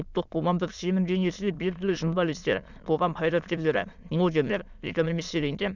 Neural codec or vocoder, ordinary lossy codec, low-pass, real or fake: autoencoder, 22.05 kHz, a latent of 192 numbers a frame, VITS, trained on many speakers; none; 7.2 kHz; fake